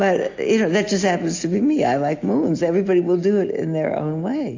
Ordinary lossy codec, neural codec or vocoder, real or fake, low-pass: AAC, 48 kbps; none; real; 7.2 kHz